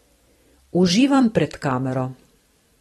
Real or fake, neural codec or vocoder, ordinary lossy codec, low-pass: fake; vocoder, 44.1 kHz, 128 mel bands every 256 samples, BigVGAN v2; AAC, 32 kbps; 19.8 kHz